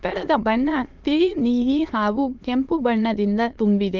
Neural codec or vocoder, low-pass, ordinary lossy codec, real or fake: autoencoder, 22.05 kHz, a latent of 192 numbers a frame, VITS, trained on many speakers; 7.2 kHz; Opus, 32 kbps; fake